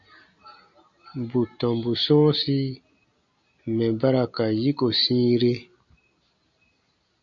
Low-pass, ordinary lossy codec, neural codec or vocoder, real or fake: 7.2 kHz; MP3, 48 kbps; none; real